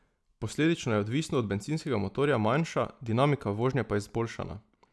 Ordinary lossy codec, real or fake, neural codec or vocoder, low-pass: none; real; none; none